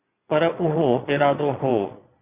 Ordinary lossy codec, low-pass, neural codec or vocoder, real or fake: Opus, 64 kbps; 3.6 kHz; vocoder, 22.05 kHz, 80 mel bands, Vocos; fake